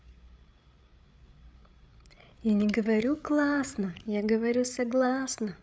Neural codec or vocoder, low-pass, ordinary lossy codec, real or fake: codec, 16 kHz, 8 kbps, FreqCodec, larger model; none; none; fake